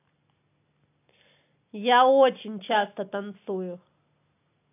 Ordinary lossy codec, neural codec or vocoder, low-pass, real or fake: AAC, 24 kbps; none; 3.6 kHz; real